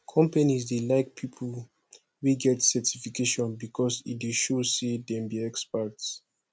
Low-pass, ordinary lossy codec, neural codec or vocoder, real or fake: none; none; none; real